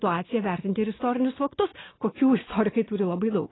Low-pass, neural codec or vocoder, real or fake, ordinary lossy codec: 7.2 kHz; none; real; AAC, 16 kbps